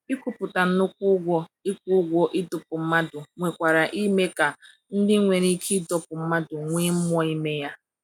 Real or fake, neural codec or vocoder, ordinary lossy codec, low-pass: real; none; none; 19.8 kHz